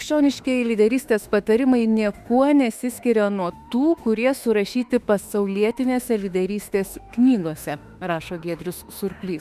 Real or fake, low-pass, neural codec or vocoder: fake; 14.4 kHz; autoencoder, 48 kHz, 32 numbers a frame, DAC-VAE, trained on Japanese speech